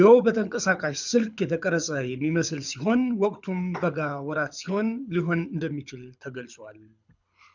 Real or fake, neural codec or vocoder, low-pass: fake; codec, 24 kHz, 6 kbps, HILCodec; 7.2 kHz